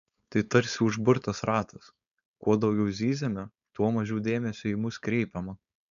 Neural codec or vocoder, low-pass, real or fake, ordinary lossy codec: codec, 16 kHz, 4.8 kbps, FACodec; 7.2 kHz; fake; MP3, 96 kbps